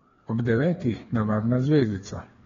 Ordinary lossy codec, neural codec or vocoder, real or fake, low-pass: AAC, 24 kbps; codec, 16 kHz, 2 kbps, FreqCodec, larger model; fake; 7.2 kHz